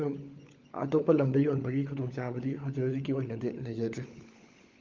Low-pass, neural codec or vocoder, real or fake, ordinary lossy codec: 7.2 kHz; codec, 16 kHz, 16 kbps, FunCodec, trained on LibriTTS, 50 frames a second; fake; Opus, 24 kbps